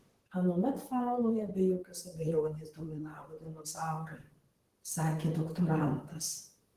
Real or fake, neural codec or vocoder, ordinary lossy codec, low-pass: fake; vocoder, 44.1 kHz, 128 mel bands, Pupu-Vocoder; Opus, 16 kbps; 14.4 kHz